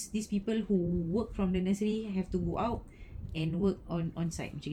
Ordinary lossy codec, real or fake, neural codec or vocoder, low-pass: none; fake; vocoder, 44.1 kHz, 128 mel bands every 512 samples, BigVGAN v2; 19.8 kHz